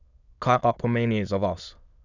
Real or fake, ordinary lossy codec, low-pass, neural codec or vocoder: fake; none; 7.2 kHz; autoencoder, 22.05 kHz, a latent of 192 numbers a frame, VITS, trained on many speakers